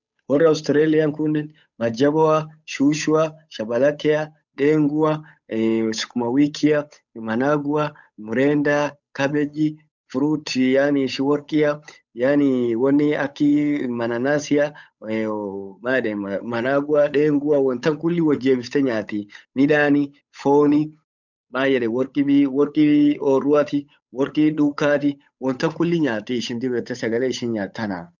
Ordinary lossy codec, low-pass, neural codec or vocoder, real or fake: none; 7.2 kHz; codec, 16 kHz, 8 kbps, FunCodec, trained on Chinese and English, 25 frames a second; fake